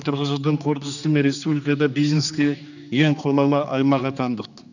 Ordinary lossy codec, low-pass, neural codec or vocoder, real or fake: none; 7.2 kHz; codec, 16 kHz, 2 kbps, X-Codec, HuBERT features, trained on general audio; fake